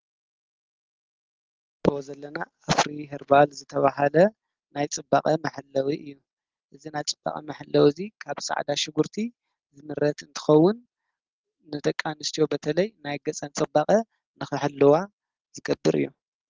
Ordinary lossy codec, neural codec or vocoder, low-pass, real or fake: Opus, 16 kbps; none; 7.2 kHz; real